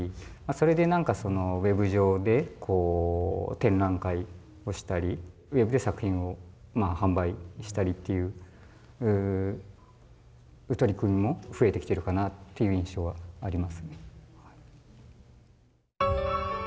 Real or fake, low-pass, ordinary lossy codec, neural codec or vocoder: real; none; none; none